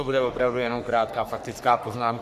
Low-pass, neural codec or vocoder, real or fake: 14.4 kHz; codec, 44.1 kHz, 3.4 kbps, Pupu-Codec; fake